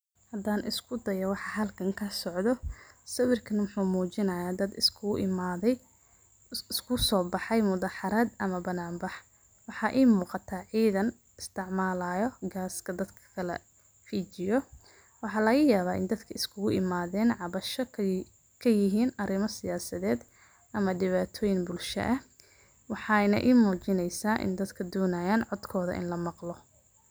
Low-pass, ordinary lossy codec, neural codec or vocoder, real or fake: none; none; none; real